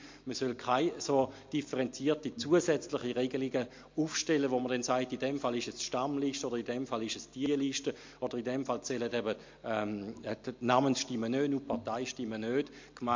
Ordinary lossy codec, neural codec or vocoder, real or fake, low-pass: MP3, 48 kbps; none; real; 7.2 kHz